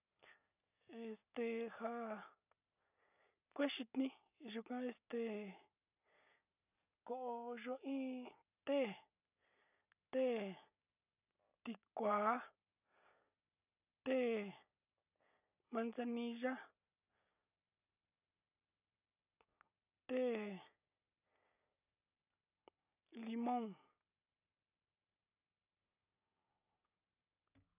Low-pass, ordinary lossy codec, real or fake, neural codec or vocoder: 3.6 kHz; none; real; none